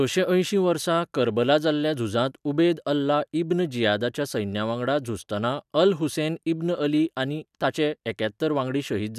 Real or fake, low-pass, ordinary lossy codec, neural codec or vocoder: real; 14.4 kHz; none; none